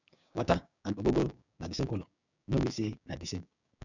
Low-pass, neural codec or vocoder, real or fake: 7.2 kHz; codec, 16 kHz, 6 kbps, DAC; fake